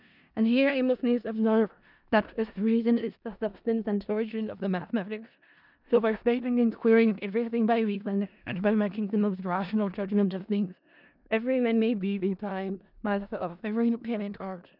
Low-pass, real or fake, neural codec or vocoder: 5.4 kHz; fake; codec, 16 kHz in and 24 kHz out, 0.4 kbps, LongCat-Audio-Codec, four codebook decoder